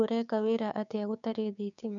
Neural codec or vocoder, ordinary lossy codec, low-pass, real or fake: codec, 16 kHz, 6 kbps, DAC; none; 7.2 kHz; fake